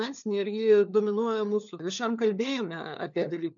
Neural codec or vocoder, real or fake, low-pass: codec, 16 kHz, 4 kbps, FunCodec, trained on LibriTTS, 50 frames a second; fake; 7.2 kHz